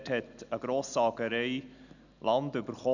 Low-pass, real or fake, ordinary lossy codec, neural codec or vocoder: 7.2 kHz; real; none; none